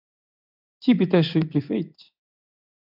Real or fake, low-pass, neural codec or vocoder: fake; 5.4 kHz; codec, 16 kHz in and 24 kHz out, 1 kbps, XY-Tokenizer